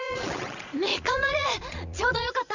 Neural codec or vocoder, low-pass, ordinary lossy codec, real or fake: vocoder, 22.05 kHz, 80 mel bands, Vocos; 7.2 kHz; Opus, 64 kbps; fake